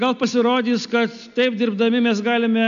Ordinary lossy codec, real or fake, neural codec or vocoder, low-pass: AAC, 96 kbps; real; none; 7.2 kHz